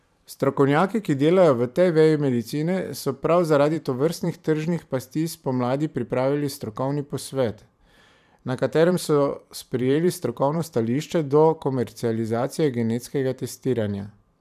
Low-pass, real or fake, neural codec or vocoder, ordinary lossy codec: 14.4 kHz; fake; vocoder, 44.1 kHz, 128 mel bands every 512 samples, BigVGAN v2; none